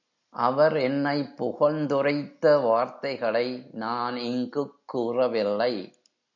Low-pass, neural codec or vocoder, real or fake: 7.2 kHz; none; real